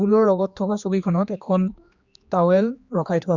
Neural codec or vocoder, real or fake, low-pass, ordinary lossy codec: codec, 16 kHz, 2 kbps, X-Codec, HuBERT features, trained on general audio; fake; 7.2 kHz; Opus, 64 kbps